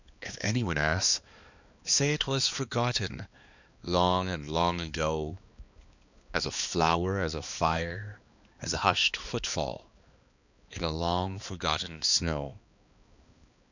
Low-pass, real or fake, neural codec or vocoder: 7.2 kHz; fake; codec, 16 kHz, 2 kbps, X-Codec, HuBERT features, trained on balanced general audio